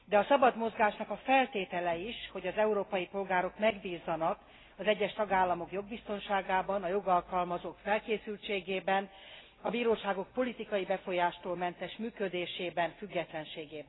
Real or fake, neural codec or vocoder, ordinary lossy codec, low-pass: real; none; AAC, 16 kbps; 7.2 kHz